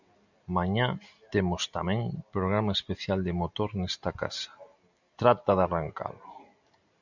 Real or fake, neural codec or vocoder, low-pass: real; none; 7.2 kHz